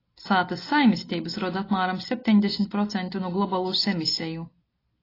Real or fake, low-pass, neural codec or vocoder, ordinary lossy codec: real; 5.4 kHz; none; AAC, 24 kbps